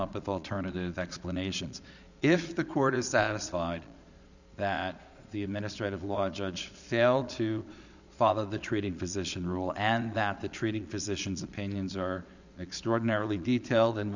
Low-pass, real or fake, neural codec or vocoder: 7.2 kHz; fake; vocoder, 22.05 kHz, 80 mel bands, Vocos